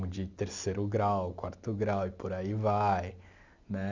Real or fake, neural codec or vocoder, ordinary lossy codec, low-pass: real; none; Opus, 64 kbps; 7.2 kHz